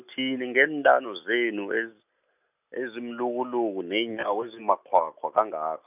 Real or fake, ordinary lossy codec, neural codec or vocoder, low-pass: real; none; none; 3.6 kHz